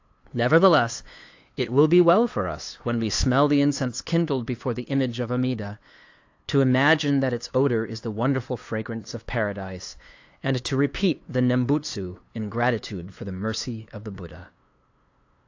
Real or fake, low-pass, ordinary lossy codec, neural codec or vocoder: fake; 7.2 kHz; AAC, 48 kbps; codec, 16 kHz, 2 kbps, FunCodec, trained on LibriTTS, 25 frames a second